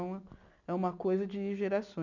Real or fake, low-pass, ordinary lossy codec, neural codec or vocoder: real; 7.2 kHz; none; none